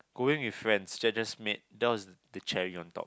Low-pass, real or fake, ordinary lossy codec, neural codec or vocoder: none; real; none; none